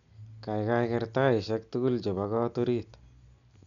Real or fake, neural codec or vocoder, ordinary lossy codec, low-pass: real; none; none; 7.2 kHz